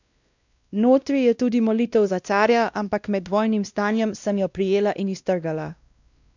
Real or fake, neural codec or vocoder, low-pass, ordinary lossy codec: fake; codec, 16 kHz, 1 kbps, X-Codec, WavLM features, trained on Multilingual LibriSpeech; 7.2 kHz; none